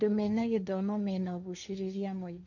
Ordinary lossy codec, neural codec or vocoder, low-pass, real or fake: none; codec, 16 kHz, 1.1 kbps, Voila-Tokenizer; 7.2 kHz; fake